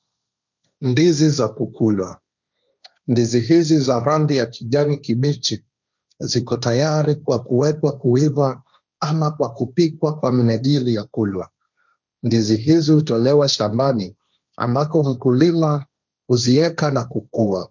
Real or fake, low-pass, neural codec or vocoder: fake; 7.2 kHz; codec, 16 kHz, 1.1 kbps, Voila-Tokenizer